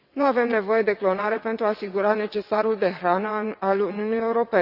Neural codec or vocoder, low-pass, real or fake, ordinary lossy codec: vocoder, 22.05 kHz, 80 mel bands, WaveNeXt; 5.4 kHz; fake; Opus, 64 kbps